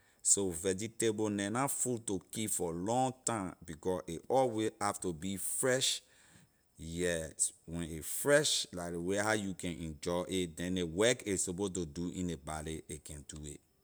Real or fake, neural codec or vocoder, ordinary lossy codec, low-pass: real; none; none; none